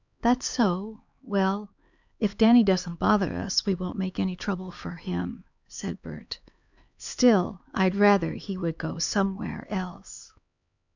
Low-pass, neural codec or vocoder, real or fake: 7.2 kHz; codec, 16 kHz, 4 kbps, X-Codec, HuBERT features, trained on LibriSpeech; fake